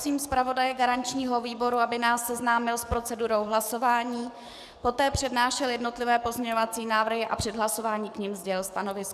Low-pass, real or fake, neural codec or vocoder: 14.4 kHz; fake; codec, 44.1 kHz, 7.8 kbps, DAC